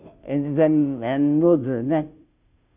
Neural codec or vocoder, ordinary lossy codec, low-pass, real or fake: codec, 16 kHz, 0.5 kbps, FunCodec, trained on Chinese and English, 25 frames a second; none; 3.6 kHz; fake